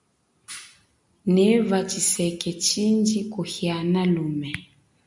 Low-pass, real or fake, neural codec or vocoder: 10.8 kHz; real; none